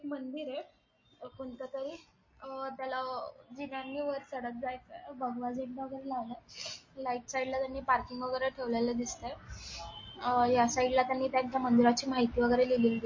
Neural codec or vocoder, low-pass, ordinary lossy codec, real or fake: none; 7.2 kHz; MP3, 32 kbps; real